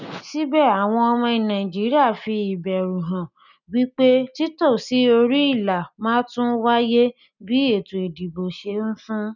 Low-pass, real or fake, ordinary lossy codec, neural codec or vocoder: 7.2 kHz; real; none; none